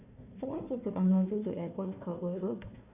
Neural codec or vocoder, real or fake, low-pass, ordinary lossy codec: codec, 16 kHz, 1 kbps, FunCodec, trained on Chinese and English, 50 frames a second; fake; 3.6 kHz; AAC, 24 kbps